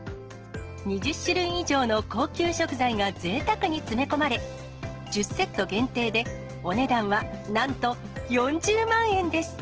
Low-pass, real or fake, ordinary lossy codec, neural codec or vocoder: 7.2 kHz; real; Opus, 16 kbps; none